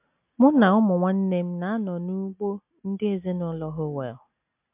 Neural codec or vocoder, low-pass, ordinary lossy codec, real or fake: none; 3.6 kHz; MP3, 32 kbps; real